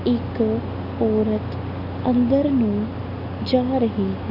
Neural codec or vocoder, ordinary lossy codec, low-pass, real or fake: none; none; 5.4 kHz; real